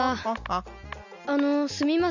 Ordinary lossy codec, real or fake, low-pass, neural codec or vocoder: none; real; 7.2 kHz; none